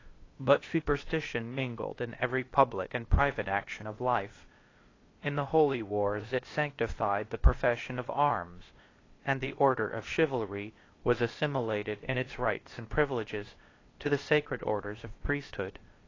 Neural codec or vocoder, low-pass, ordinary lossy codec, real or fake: codec, 16 kHz, 0.8 kbps, ZipCodec; 7.2 kHz; AAC, 32 kbps; fake